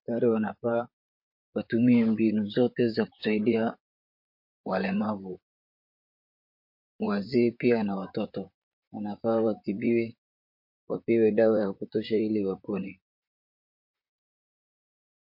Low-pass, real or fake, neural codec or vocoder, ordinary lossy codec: 5.4 kHz; fake; vocoder, 44.1 kHz, 128 mel bands, Pupu-Vocoder; MP3, 32 kbps